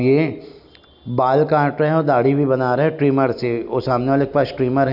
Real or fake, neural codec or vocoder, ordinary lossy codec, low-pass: real; none; none; 5.4 kHz